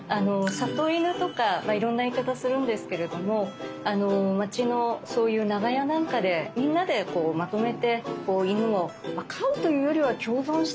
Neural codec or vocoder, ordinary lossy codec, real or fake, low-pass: none; none; real; none